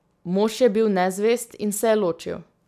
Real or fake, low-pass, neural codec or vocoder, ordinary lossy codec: real; 14.4 kHz; none; none